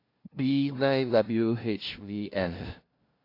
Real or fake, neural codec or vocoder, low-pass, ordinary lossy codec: fake; codec, 16 kHz, 0.5 kbps, FunCodec, trained on LibriTTS, 25 frames a second; 5.4 kHz; AAC, 32 kbps